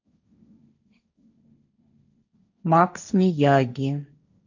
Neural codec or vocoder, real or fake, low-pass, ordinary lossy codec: codec, 16 kHz, 1.1 kbps, Voila-Tokenizer; fake; 7.2 kHz; none